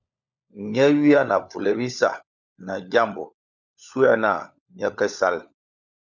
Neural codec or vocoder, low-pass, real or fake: codec, 16 kHz, 16 kbps, FunCodec, trained on LibriTTS, 50 frames a second; 7.2 kHz; fake